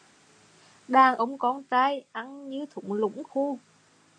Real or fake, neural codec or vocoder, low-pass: real; none; 9.9 kHz